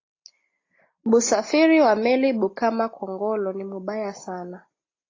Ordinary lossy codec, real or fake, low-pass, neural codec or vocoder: AAC, 32 kbps; real; 7.2 kHz; none